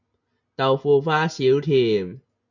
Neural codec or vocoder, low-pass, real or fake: none; 7.2 kHz; real